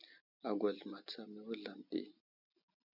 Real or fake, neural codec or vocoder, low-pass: real; none; 5.4 kHz